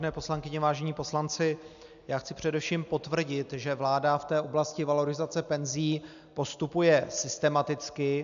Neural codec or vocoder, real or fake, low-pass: none; real; 7.2 kHz